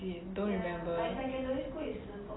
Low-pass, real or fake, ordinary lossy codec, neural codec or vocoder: 7.2 kHz; real; AAC, 16 kbps; none